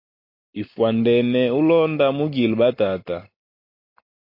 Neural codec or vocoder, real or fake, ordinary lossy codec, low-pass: none; real; MP3, 32 kbps; 5.4 kHz